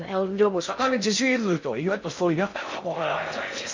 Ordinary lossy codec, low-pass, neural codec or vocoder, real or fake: MP3, 48 kbps; 7.2 kHz; codec, 16 kHz in and 24 kHz out, 0.6 kbps, FocalCodec, streaming, 2048 codes; fake